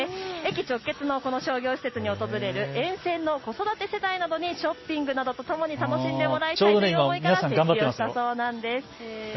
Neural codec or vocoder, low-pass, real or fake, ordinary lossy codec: none; 7.2 kHz; real; MP3, 24 kbps